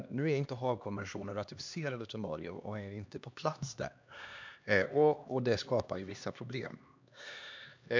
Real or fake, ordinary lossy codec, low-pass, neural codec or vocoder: fake; MP3, 64 kbps; 7.2 kHz; codec, 16 kHz, 2 kbps, X-Codec, HuBERT features, trained on LibriSpeech